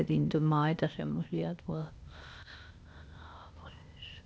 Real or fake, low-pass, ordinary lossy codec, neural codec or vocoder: fake; none; none; codec, 16 kHz, 0.8 kbps, ZipCodec